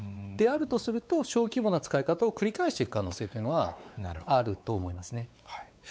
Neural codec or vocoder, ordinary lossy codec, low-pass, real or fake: codec, 16 kHz, 4 kbps, X-Codec, WavLM features, trained on Multilingual LibriSpeech; none; none; fake